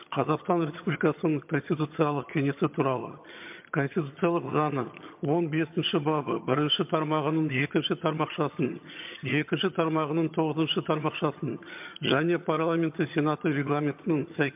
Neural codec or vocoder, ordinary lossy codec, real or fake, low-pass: vocoder, 22.05 kHz, 80 mel bands, HiFi-GAN; MP3, 32 kbps; fake; 3.6 kHz